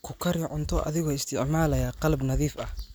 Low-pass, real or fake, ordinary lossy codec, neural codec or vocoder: none; real; none; none